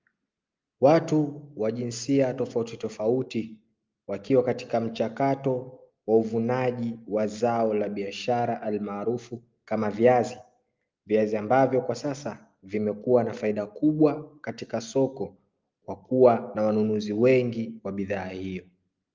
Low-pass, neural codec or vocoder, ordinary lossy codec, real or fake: 7.2 kHz; none; Opus, 24 kbps; real